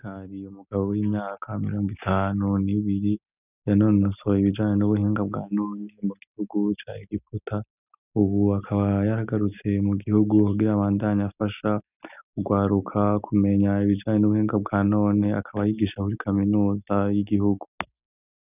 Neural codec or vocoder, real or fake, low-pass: none; real; 3.6 kHz